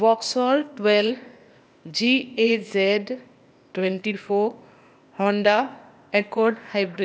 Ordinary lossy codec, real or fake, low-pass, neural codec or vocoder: none; fake; none; codec, 16 kHz, 0.8 kbps, ZipCodec